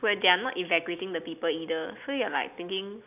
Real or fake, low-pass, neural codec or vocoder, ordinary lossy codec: real; 3.6 kHz; none; none